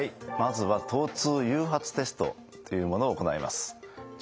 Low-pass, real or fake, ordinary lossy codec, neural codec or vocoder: none; real; none; none